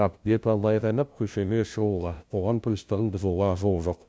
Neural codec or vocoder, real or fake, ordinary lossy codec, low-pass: codec, 16 kHz, 0.5 kbps, FunCodec, trained on LibriTTS, 25 frames a second; fake; none; none